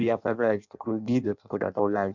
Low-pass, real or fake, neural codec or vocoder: 7.2 kHz; fake; codec, 16 kHz in and 24 kHz out, 0.6 kbps, FireRedTTS-2 codec